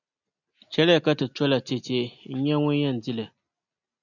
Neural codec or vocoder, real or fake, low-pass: none; real; 7.2 kHz